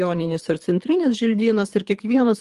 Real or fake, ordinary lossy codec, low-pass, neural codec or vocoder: fake; Opus, 24 kbps; 10.8 kHz; codec, 24 kHz, 3 kbps, HILCodec